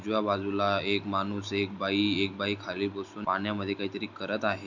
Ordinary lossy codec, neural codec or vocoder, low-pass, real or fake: none; none; 7.2 kHz; real